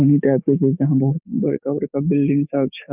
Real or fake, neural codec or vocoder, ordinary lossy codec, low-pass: fake; codec, 44.1 kHz, 7.8 kbps, DAC; none; 3.6 kHz